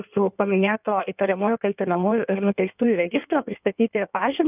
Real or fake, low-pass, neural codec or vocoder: fake; 3.6 kHz; codec, 16 kHz in and 24 kHz out, 1.1 kbps, FireRedTTS-2 codec